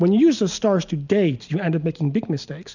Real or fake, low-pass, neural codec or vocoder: real; 7.2 kHz; none